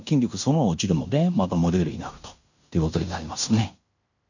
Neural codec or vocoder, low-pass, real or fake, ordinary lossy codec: codec, 16 kHz in and 24 kHz out, 0.9 kbps, LongCat-Audio-Codec, fine tuned four codebook decoder; 7.2 kHz; fake; none